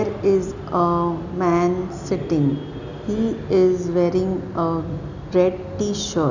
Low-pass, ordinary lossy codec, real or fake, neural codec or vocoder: 7.2 kHz; none; real; none